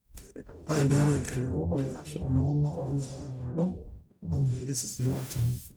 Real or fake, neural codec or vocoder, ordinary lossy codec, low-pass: fake; codec, 44.1 kHz, 0.9 kbps, DAC; none; none